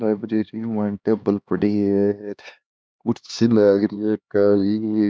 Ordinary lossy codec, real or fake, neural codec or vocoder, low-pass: none; fake; codec, 16 kHz, 2 kbps, X-Codec, HuBERT features, trained on LibriSpeech; none